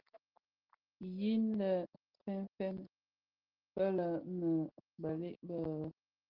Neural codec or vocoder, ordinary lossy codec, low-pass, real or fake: none; Opus, 16 kbps; 5.4 kHz; real